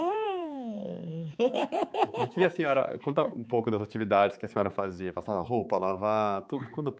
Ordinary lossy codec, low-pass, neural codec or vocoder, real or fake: none; none; codec, 16 kHz, 4 kbps, X-Codec, HuBERT features, trained on balanced general audio; fake